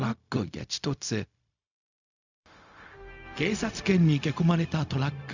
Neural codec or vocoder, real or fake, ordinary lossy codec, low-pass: codec, 16 kHz, 0.4 kbps, LongCat-Audio-Codec; fake; none; 7.2 kHz